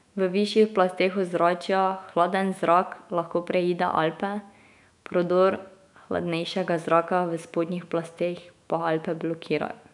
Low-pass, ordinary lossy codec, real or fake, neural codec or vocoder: 10.8 kHz; none; fake; autoencoder, 48 kHz, 128 numbers a frame, DAC-VAE, trained on Japanese speech